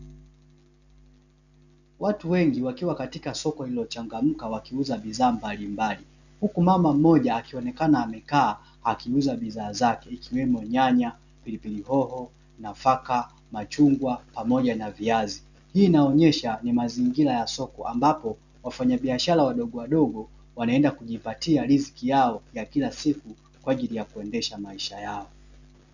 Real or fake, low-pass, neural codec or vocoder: real; 7.2 kHz; none